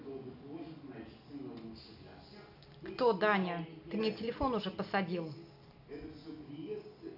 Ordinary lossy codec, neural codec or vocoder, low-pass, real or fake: none; none; 5.4 kHz; real